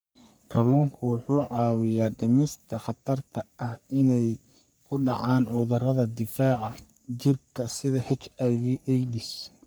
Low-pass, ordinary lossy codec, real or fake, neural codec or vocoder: none; none; fake; codec, 44.1 kHz, 3.4 kbps, Pupu-Codec